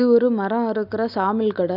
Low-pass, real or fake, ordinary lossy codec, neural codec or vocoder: 5.4 kHz; real; none; none